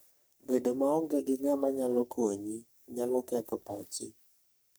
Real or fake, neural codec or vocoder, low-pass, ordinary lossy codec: fake; codec, 44.1 kHz, 3.4 kbps, Pupu-Codec; none; none